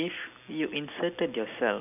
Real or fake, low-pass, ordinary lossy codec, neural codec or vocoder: real; 3.6 kHz; none; none